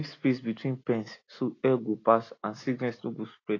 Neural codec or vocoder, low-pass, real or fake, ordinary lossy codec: none; 7.2 kHz; real; AAC, 32 kbps